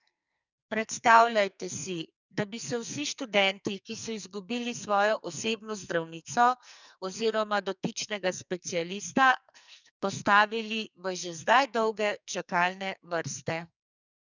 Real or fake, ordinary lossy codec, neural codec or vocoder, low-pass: fake; none; codec, 44.1 kHz, 2.6 kbps, SNAC; 7.2 kHz